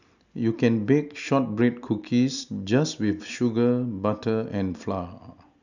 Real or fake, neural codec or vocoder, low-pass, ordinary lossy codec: real; none; 7.2 kHz; none